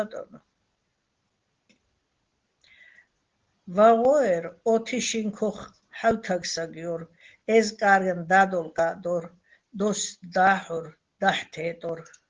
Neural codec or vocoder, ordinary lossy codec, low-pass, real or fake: none; Opus, 16 kbps; 7.2 kHz; real